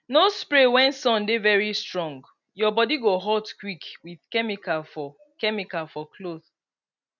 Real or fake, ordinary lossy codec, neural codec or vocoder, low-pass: real; none; none; 7.2 kHz